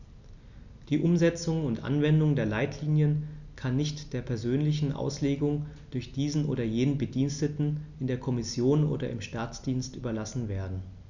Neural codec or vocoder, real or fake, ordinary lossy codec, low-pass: none; real; none; 7.2 kHz